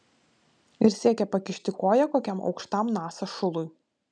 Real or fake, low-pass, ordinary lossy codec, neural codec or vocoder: real; 9.9 kHz; MP3, 96 kbps; none